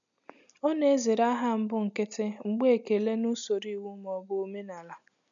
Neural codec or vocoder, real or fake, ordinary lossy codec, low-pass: none; real; none; 7.2 kHz